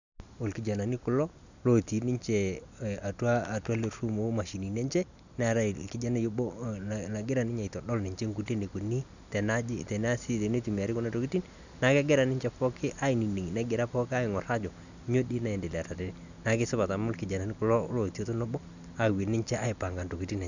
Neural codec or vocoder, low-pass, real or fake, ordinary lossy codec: none; 7.2 kHz; real; none